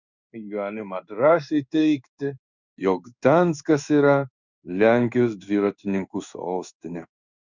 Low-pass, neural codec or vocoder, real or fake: 7.2 kHz; codec, 16 kHz in and 24 kHz out, 1 kbps, XY-Tokenizer; fake